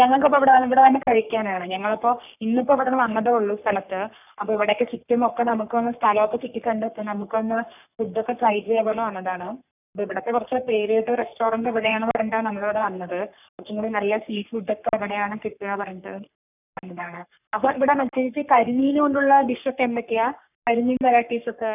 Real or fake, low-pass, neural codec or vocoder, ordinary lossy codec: fake; 3.6 kHz; codec, 44.1 kHz, 3.4 kbps, Pupu-Codec; none